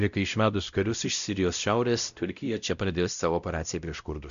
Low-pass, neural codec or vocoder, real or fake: 7.2 kHz; codec, 16 kHz, 0.5 kbps, X-Codec, WavLM features, trained on Multilingual LibriSpeech; fake